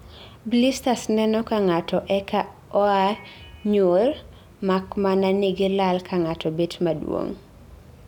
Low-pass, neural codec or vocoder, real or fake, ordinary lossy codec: 19.8 kHz; none; real; none